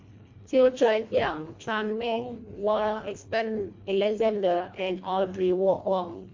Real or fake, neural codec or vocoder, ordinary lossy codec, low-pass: fake; codec, 24 kHz, 1.5 kbps, HILCodec; MP3, 48 kbps; 7.2 kHz